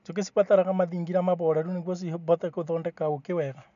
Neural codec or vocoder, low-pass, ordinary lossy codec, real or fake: none; 7.2 kHz; none; real